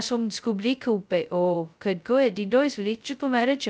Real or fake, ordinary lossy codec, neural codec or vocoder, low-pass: fake; none; codec, 16 kHz, 0.2 kbps, FocalCodec; none